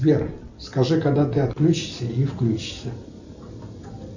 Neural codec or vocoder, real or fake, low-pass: none; real; 7.2 kHz